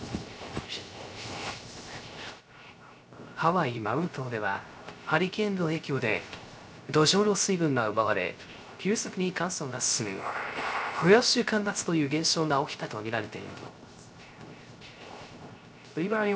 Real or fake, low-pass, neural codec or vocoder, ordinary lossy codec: fake; none; codec, 16 kHz, 0.3 kbps, FocalCodec; none